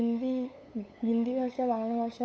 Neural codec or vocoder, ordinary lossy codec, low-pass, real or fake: codec, 16 kHz, 8 kbps, FunCodec, trained on LibriTTS, 25 frames a second; none; none; fake